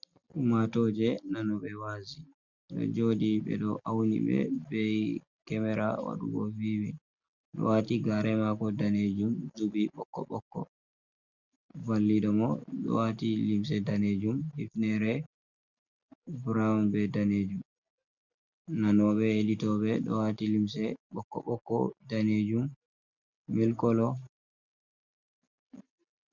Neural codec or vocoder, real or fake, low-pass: none; real; 7.2 kHz